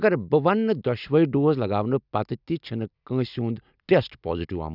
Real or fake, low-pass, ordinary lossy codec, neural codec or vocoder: real; 5.4 kHz; none; none